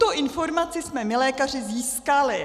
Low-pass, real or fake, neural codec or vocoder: 14.4 kHz; real; none